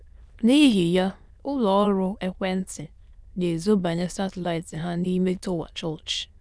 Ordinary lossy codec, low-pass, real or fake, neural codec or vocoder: none; none; fake; autoencoder, 22.05 kHz, a latent of 192 numbers a frame, VITS, trained on many speakers